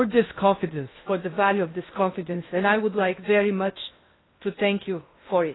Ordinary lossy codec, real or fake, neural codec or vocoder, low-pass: AAC, 16 kbps; fake; codec, 16 kHz in and 24 kHz out, 0.8 kbps, FocalCodec, streaming, 65536 codes; 7.2 kHz